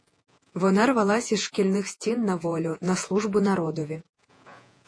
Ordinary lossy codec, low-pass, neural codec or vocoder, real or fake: AAC, 48 kbps; 9.9 kHz; vocoder, 48 kHz, 128 mel bands, Vocos; fake